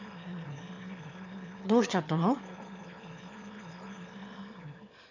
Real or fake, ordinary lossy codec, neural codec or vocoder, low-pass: fake; none; autoencoder, 22.05 kHz, a latent of 192 numbers a frame, VITS, trained on one speaker; 7.2 kHz